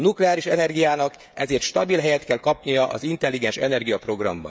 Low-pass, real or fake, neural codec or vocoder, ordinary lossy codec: none; fake; codec, 16 kHz, 16 kbps, FreqCodec, smaller model; none